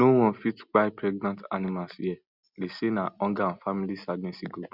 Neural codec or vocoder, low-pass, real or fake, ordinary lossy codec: none; 5.4 kHz; real; Opus, 64 kbps